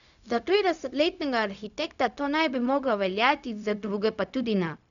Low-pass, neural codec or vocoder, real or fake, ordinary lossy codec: 7.2 kHz; codec, 16 kHz, 0.4 kbps, LongCat-Audio-Codec; fake; none